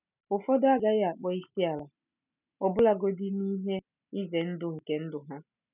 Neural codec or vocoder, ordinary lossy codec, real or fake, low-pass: none; none; real; 3.6 kHz